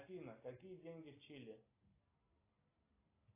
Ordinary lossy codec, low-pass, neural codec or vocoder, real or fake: AAC, 24 kbps; 3.6 kHz; none; real